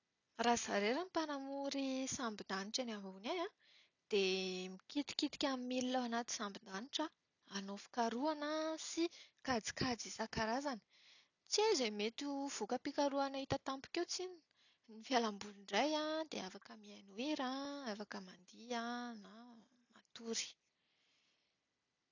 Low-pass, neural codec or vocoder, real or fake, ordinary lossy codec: 7.2 kHz; none; real; none